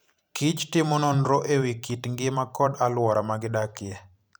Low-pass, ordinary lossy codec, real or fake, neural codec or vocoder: none; none; fake; vocoder, 44.1 kHz, 128 mel bands every 512 samples, BigVGAN v2